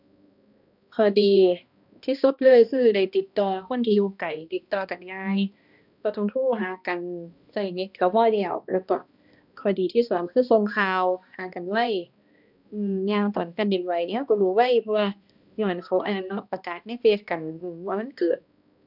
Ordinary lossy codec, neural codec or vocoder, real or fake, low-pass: none; codec, 16 kHz, 1 kbps, X-Codec, HuBERT features, trained on balanced general audio; fake; 5.4 kHz